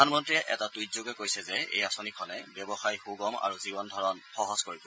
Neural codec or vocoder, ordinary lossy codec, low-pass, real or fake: none; none; none; real